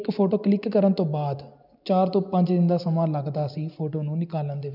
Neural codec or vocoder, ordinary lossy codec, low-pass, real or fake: none; none; 5.4 kHz; real